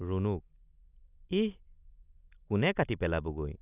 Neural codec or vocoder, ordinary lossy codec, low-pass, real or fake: none; none; 3.6 kHz; real